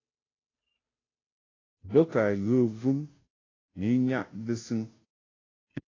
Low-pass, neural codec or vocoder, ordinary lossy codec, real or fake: 7.2 kHz; codec, 16 kHz, 0.5 kbps, FunCodec, trained on Chinese and English, 25 frames a second; AAC, 32 kbps; fake